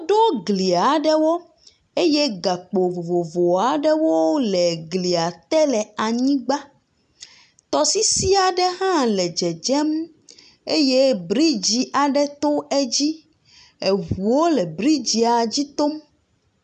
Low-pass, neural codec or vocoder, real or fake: 9.9 kHz; none; real